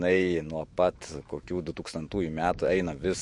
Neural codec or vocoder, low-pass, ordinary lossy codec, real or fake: autoencoder, 48 kHz, 128 numbers a frame, DAC-VAE, trained on Japanese speech; 10.8 kHz; MP3, 48 kbps; fake